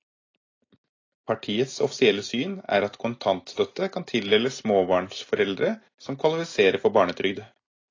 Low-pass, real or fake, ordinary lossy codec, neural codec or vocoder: 7.2 kHz; real; AAC, 32 kbps; none